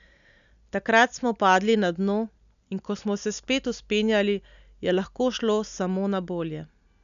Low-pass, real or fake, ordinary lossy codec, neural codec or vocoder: 7.2 kHz; real; none; none